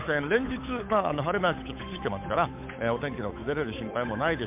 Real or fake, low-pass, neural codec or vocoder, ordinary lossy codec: fake; 3.6 kHz; codec, 16 kHz, 8 kbps, FunCodec, trained on Chinese and English, 25 frames a second; MP3, 32 kbps